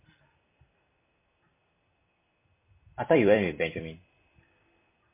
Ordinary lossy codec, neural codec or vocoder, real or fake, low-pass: MP3, 24 kbps; vocoder, 44.1 kHz, 128 mel bands every 256 samples, BigVGAN v2; fake; 3.6 kHz